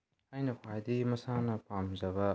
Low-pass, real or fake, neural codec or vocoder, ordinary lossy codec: none; real; none; none